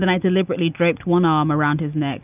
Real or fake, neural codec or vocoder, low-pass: real; none; 3.6 kHz